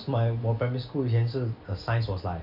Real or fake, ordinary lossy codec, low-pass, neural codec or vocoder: real; none; 5.4 kHz; none